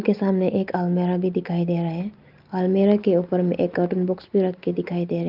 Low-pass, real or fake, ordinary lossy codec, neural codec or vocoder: 5.4 kHz; real; Opus, 32 kbps; none